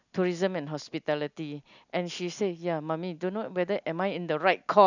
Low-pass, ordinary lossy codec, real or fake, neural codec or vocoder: 7.2 kHz; none; real; none